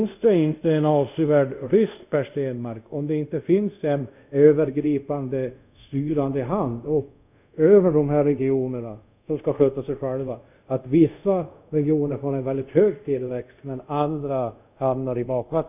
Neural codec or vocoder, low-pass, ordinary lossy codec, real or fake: codec, 24 kHz, 0.5 kbps, DualCodec; 3.6 kHz; none; fake